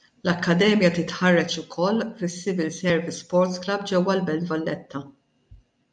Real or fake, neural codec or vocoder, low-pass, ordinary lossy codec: real; none; 9.9 kHz; MP3, 96 kbps